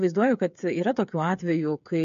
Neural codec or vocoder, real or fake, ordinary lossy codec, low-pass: codec, 16 kHz, 8 kbps, FreqCodec, smaller model; fake; MP3, 48 kbps; 7.2 kHz